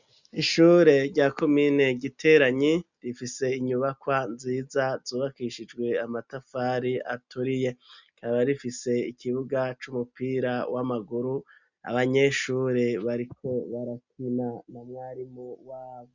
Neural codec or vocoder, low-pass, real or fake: none; 7.2 kHz; real